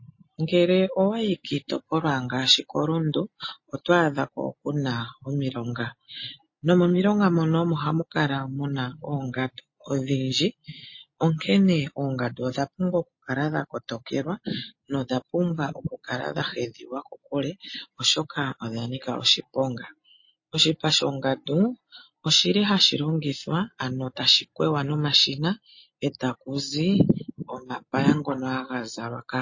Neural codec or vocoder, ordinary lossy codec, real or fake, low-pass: none; MP3, 32 kbps; real; 7.2 kHz